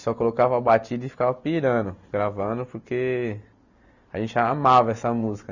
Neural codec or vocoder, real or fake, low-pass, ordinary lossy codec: none; real; 7.2 kHz; none